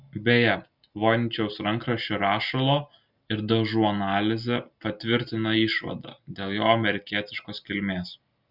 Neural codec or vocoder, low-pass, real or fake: none; 5.4 kHz; real